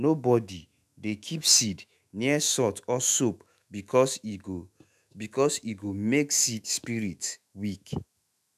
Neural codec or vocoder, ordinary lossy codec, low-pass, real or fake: autoencoder, 48 kHz, 128 numbers a frame, DAC-VAE, trained on Japanese speech; none; 14.4 kHz; fake